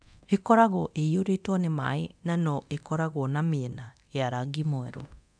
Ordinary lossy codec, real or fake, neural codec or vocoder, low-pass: none; fake; codec, 24 kHz, 0.9 kbps, DualCodec; 9.9 kHz